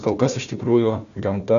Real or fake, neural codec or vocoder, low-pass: fake; codec, 16 kHz, 1 kbps, FunCodec, trained on Chinese and English, 50 frames a second; 7.2 kHz